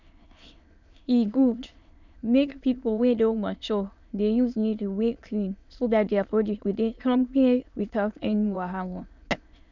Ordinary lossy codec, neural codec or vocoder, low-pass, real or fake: none; autoencoder, 22.05 kHz, a latent of 192 numbers a frame, VITS, trained on many speakers; 7.2 kHz; fake